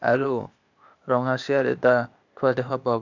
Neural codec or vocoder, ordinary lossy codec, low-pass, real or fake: codec, 16 kHz, 0.8 kbps, ZipCodec; none; 7.2 kHz; fake